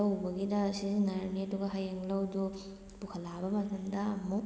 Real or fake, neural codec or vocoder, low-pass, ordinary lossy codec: real; none; none; none